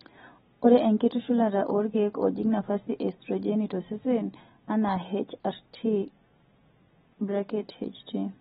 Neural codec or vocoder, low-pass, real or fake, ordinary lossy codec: none; 19.8 kHz; real; AAC, 16 kbps